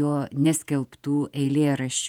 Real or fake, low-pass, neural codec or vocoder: fake; 19.8 kHz; vocoder, 48 kHz, 128 mel bands, Vocos